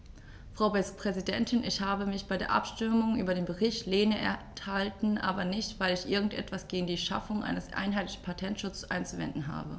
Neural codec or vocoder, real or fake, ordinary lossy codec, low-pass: none; real; none; none